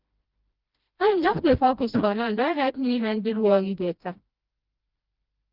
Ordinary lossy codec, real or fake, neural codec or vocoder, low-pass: Opus, 32 kbps; fake; codec, 16 kHz, 1 kbps, FreqCodec, smaller model; 5.4 kHz